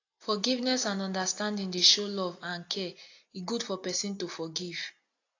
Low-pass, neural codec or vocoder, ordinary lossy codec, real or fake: 7.2 kHz; none; AAC, 48 kbps; real